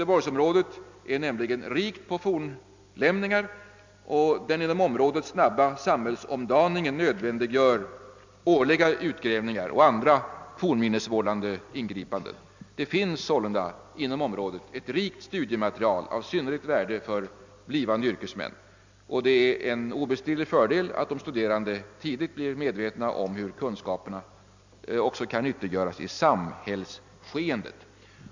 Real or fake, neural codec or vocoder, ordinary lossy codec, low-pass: real; none; MP3, 64 kbps; 7.2 kHz